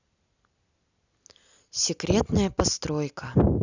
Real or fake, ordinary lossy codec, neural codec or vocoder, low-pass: real; none; none; 7.2 kHz